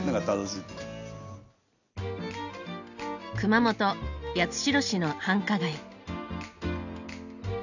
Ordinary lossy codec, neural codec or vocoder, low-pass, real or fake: none; none; 7.2 kHz; real